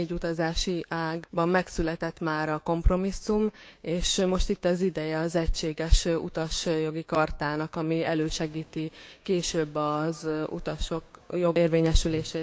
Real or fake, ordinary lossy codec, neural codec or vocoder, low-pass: fake; none; codec, 16 kHz, 6 kbps, DAC; none